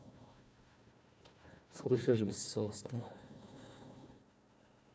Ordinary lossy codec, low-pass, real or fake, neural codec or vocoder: none; none; fake; codec, 16 kHz, 1 kbps, FunCodec, trained on Chinese and English, 50 frames a second